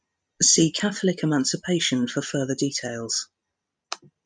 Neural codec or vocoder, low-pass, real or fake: none; 9.9 kHz; real